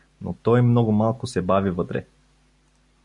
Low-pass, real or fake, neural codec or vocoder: 10.8 kHz; real; none